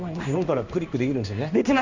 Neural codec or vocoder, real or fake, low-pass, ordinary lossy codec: codec, 16 kHz in and 24 kHz out, 1 kbps, XY-Tokenizer; fake; 7.2 kHz; Opus, 64 kbps